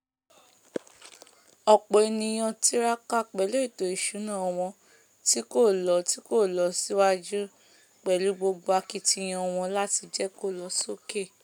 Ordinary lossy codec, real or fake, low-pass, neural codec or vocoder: none; real; none; none